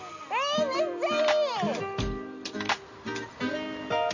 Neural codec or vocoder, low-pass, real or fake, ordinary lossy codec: none; 7.2 kHz; real; none